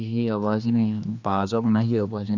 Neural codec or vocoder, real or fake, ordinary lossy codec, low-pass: codec, 16 kHz, 2 kbps, X-Codec, HuBERT features, trained on general audio; fake; none; 7.2 kHz